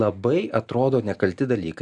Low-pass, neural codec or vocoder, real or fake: 10.8 kHz; none; real